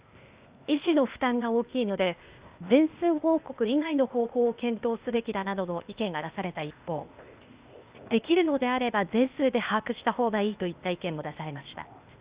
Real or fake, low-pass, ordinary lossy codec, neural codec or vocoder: fake; 3.6 kHz; Opus, 64 kbps; codec, 16 kHz, 0.8 kbps, ZipCodec